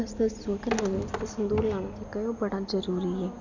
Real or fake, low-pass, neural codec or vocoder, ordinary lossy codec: real; 7.2 kHz; none; none